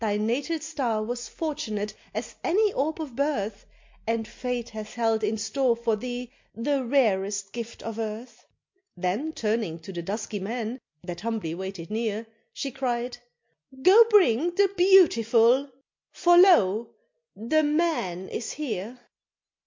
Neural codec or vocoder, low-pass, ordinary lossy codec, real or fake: none; 7.2 kHz; MP3, 48 kbps; real